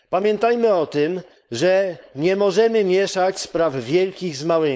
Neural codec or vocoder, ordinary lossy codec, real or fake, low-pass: codec, 16 kHz, 4.8 kbps, FACodec; none; fake; none